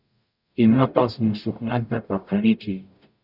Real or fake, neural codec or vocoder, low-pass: fake; codec, 44.1 kHz, 0.9 kbps, DAC; 5.4 kHz